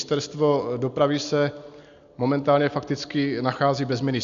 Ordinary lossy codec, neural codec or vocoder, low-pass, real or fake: MP3, 64 kbps; none; 7.2 kHz; real